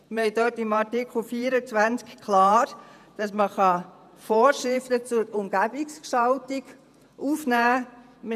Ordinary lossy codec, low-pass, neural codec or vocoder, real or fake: none; 14.4 kHz; vocoder, 48 kHz, 128 mel bands, Vocos; fake